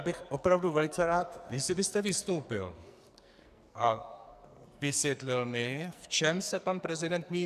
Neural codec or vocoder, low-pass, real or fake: codec, 44.1 kHz, 2.6 kbps, SNAC; 14.4 kHz; fake